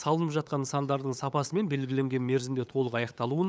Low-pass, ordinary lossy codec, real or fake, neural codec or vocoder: none; none; fake; codec, 16 kHz, 8 kbps, FunCodec, trained on LibriTTS, 25 frames a second